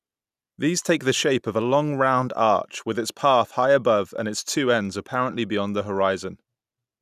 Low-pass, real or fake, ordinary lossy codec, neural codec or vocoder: 14.4 kHz; real; none; none